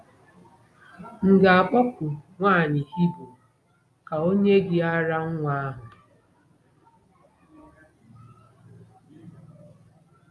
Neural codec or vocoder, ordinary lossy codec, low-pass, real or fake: none; none; none; real